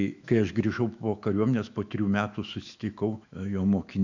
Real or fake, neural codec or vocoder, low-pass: fake; autoencoder, 48 kHz, 128 numbers a frame, DAC-VAE, trained on Japanese speech; 7.2 kHz